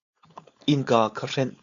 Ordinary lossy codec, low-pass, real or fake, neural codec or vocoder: AAC, 64 kbps; 7.2 kHz; fake; codec, 16 kHz, 4.8 kbps, FACodec